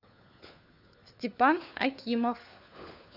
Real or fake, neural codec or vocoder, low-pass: fake; codec, 16 kHz, 4 kbps, FunCodec, trained on LibriTTS, 50 frames a second; 5.4 kHz